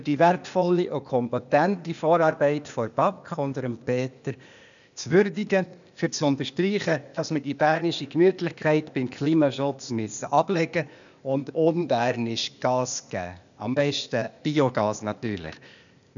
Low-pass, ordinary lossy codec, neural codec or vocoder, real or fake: 7.2 kHz; none; codec, 16 kHz, 0.8 kbps, ZipCodec; fake